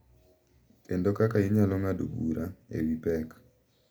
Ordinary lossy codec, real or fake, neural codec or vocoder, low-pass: none; real; none; none